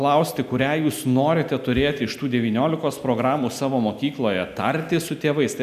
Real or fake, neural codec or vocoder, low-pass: real; none; 14.4 kHz